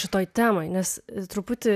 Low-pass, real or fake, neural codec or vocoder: 14.4 kHz; real; none